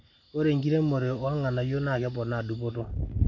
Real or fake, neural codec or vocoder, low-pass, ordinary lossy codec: real; none; 7.2 kHz; AAC, 48 kbps